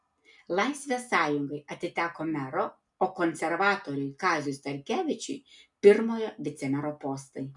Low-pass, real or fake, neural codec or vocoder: 10.8 kHz; real; none